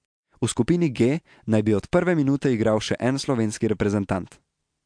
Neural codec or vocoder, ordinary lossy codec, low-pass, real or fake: none; MP3, 64 kbps; 9.9 kHz; real